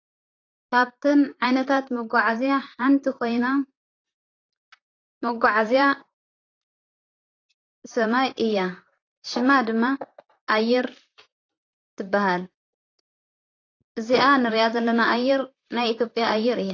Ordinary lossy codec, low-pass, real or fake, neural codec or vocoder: AAC, 32 kbps; 7.2 kHz; fake; vocoder, 44.1 kHz, 128 mel bands, Pupu-Vocoder